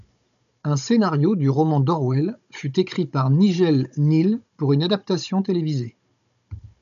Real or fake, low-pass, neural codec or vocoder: fake; 7.2 kHz; codec, 16 kHz, 16 kbps, FunCodec, trained on Chinese and English, 50 frames a second